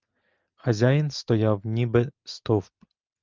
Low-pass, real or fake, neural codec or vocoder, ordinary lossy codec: 7.2 kHz; real; none; Opus, 24 kbps